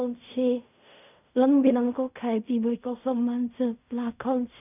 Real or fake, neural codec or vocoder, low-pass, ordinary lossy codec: fake; codec, 16 kHz in and 24 kHz out, 0.4 kbps, LongCat-Audio-Codec, fine tuned four codebook decoder; 3.6 kHz; none